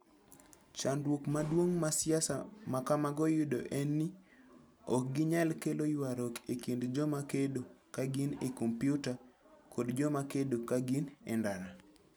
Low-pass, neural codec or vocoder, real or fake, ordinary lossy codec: none; none; real; none